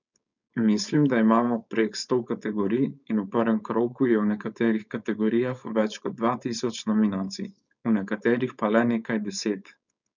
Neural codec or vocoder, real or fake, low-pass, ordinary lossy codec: codec, 16 kHz, 4.8 kbps, FACodec; fake; 7.2 kHz; none